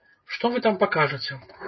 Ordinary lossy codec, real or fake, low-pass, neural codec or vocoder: MP3, 24 kbps; real; 7.2 kHz; none